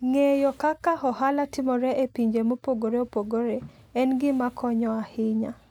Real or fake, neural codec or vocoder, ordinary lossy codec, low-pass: real; none; none; 19.8 kHz